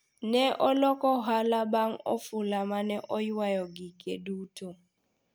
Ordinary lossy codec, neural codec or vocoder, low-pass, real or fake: none; none; none; real